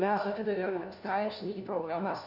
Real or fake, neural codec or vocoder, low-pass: fake; codec, 16 kHz, 1 kbps, FunCodec, trained on LibriTTS, 50 frames a second; 5.4 kHz